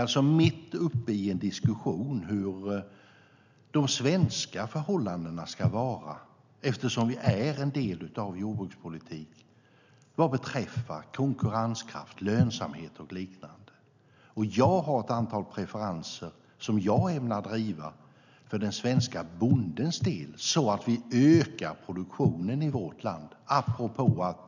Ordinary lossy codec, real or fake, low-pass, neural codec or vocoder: none; real; 7.2 kHz; none